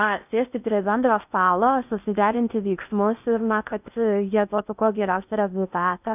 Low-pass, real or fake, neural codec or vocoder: 3.6 kHz; fake; codec, 16 kHz in and 24 kHz out, 0.6 kbps, FocalCodec, streaming, 4096 codes